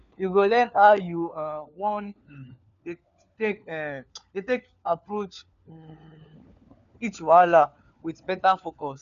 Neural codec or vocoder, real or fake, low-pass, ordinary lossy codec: codec, 16 kHz, 4 kbps, FunCodec, trained on LibriTTS, 50 frames a second; fake; 7.2 kHz; none